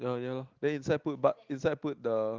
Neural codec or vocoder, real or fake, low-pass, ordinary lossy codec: none; real; 7.2 kHz; Opus, 32 kbps